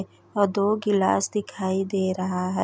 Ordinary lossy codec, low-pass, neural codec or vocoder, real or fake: none; none; none; real